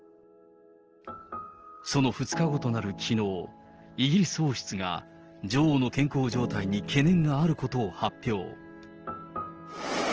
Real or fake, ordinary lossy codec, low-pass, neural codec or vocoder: real; Opus, 16 kbps; 7.2 kHz; none